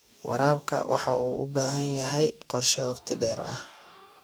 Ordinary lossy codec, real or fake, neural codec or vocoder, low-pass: none; fake; codec, 44.1 kHz, 2.6 kbps, DAC; none